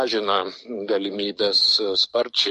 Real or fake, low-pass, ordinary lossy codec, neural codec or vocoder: fake; 14.4 kHz; MP3, 48 kbps; codec, 44.1 kHz, 7.8 kbps, DAC